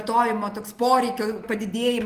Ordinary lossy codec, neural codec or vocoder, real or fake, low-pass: Opus, 24 kbps; none; real; 14.4 kHz